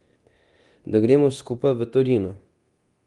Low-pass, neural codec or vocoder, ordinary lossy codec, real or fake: 10.8 kHz; codec, 24 kHz, 0.9 kbps, DualCodec; Opus, 24 kbps; fake